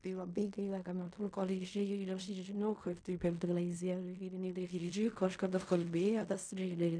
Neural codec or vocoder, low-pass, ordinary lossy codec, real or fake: codec, 16 kHz in and 24 kHz out, 0.4 kbps, LongCat-Audio-Codec, fine tuned four codebook decoder; 9.9 kHz; MP3, 96 kbps; fake